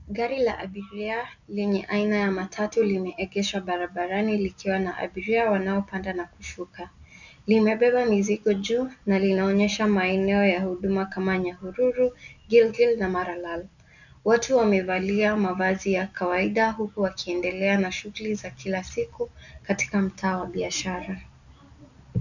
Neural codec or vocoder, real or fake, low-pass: none; real; 7.2 kHz